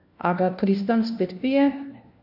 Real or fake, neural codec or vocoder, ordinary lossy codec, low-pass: fake; codec, 16 kHz, 1 kbps, FunCodec, trained on LibriTTS, 50 frames a second; none; 5.4 kHz